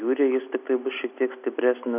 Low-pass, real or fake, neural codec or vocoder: 3.6 kHz; real; none